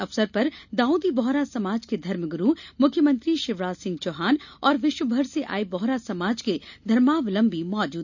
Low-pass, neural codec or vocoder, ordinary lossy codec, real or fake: 7.2 kHz; none; none; real